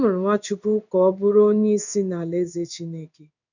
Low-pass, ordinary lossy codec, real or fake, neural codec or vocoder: 7.2 kHz; none; fake; codec, 16 kHz in and 24 kHz out, 1 kbps, XY-Tokenizer